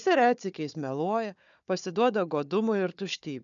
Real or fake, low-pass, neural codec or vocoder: fake; 7.2 kHz; codec, 16 kHz, 4 kbps, FunCodec, trained on Chinese and English, 50 frames a second